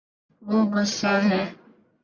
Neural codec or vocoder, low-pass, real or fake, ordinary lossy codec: codec, 44.1 kHz, 1.7 kbps, Pupu-Codec; 7.2 kHz; fake; Opus, 64 kbps